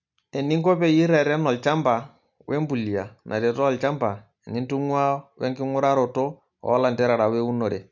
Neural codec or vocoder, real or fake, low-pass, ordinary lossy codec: none; real; 7.2 kHz; none